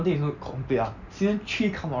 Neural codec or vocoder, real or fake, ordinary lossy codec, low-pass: none; real; none; 7.2 kHz